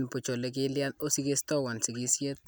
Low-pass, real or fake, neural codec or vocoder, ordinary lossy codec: none; real; none; none